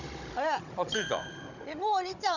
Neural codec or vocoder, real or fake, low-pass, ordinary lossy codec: codec, 16 kHz, 16 kbps, FunCodec, trained on Chinese and English, 50 frames a second; fake; 7.2 kHz; none